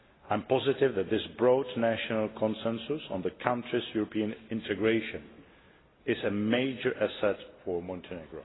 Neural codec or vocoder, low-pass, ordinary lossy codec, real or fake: none; 7.2 kHz; AAC, 16 kbps; real